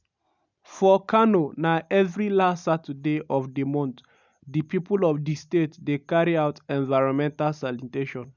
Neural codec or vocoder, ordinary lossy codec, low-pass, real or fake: none; none; 7.2 kHz; real